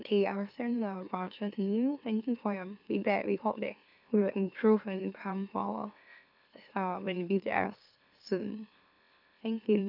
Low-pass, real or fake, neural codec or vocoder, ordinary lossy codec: 5.4 kHz; fake; autoencoder, 44.1 kHz, a latent of 192 numbers a frame, MeloTTS; none